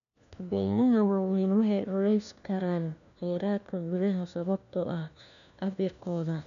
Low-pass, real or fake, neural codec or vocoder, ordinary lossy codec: 7.2 kHz; fake; codec, 16 kHz, 1 kbps, FunCodec, trained on LibriTTS, 50 frames a second; none